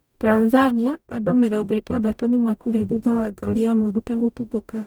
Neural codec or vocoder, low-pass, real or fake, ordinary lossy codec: codec, 44.1 kHz, 0.9 kbps, DAC; none; fake; none